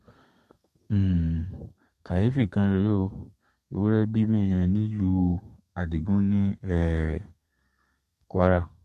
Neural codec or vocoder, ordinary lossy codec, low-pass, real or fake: codec, 32 kHz, 1.9 kbps, SNAC; MP3, 64 kbps; 14.4 kHz; fake